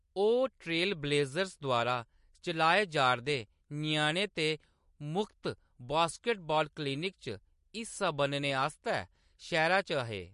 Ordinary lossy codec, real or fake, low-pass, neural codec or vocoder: MP3, 48 kbps; real; 14.4 kHz; none